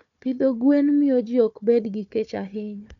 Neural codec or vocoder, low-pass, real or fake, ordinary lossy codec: codec, 16 kHz, 4 kbps, FunCodec, trained on Chinese and English, 50 frames a second; 7.2 kHz; fake; none